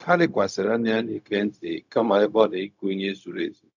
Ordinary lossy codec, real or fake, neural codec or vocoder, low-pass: none; fake; codec, 16 kHz, 0.4 kbps, LongCat-Audio-Codec; 7.2 kHz